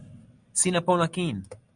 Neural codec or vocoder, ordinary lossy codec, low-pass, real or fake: none; Opus, 64 kbps; 9.9 kHz; real